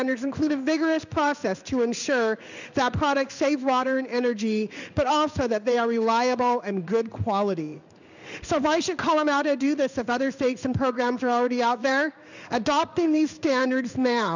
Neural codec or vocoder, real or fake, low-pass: codec, 16 kHz in and 24 kHz out, 1 kbps, XY-Tokenizer; fake; 7.2 kHz